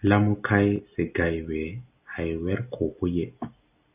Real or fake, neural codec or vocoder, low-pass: real; none; 3.6 kHz